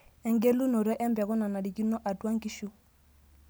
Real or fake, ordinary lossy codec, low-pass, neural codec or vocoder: real; none; none; none